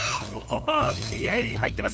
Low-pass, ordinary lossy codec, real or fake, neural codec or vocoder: none; none; fake; codec, 16 kHz, 2 kbps, FunCodec, trained on LibriTTS, 25 frames a second